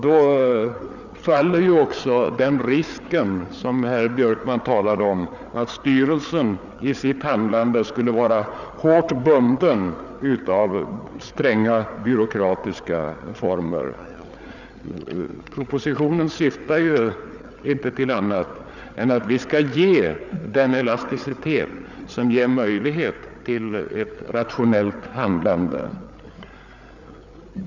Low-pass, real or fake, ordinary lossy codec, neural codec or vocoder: 7.2 kHz; fake; none; codec, 16 kHz, 8 kbps, FreqCodec, larger model